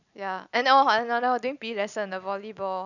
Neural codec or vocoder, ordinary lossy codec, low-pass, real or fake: none; none; 7.2 kHz; real